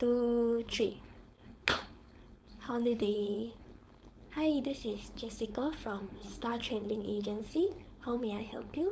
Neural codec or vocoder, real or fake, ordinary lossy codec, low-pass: codec, 16 kHz, 4.8 kbps, FACodec; fake; none; none